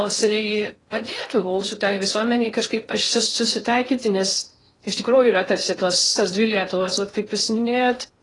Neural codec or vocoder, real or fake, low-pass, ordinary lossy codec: codec, 16 kHz in and 24 kHz out, 0.6 kbps, FocalCodec, streaming, 4096 codes; fake; 10.8 kHz; AAC, 32 kbps